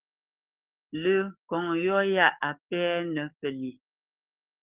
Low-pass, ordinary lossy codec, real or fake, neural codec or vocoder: 3.6 kHz; Opus, 24 kbps; fake; codec, 44.1 kHz, 7.8 kbps, DAC